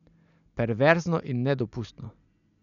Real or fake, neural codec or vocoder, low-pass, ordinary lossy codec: real; none; 7.2 kHz; none